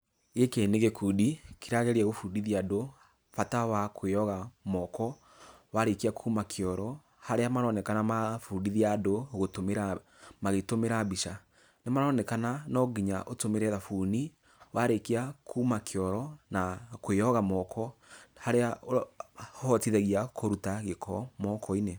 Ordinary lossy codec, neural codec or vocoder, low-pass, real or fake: none; none; none; real